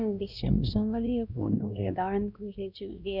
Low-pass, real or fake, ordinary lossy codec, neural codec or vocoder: 5.4 kHz; fake; none; codec, 16 kHz, 1 kbps, X-Codec, WavLM features, trained on Multilingual LibriSpeech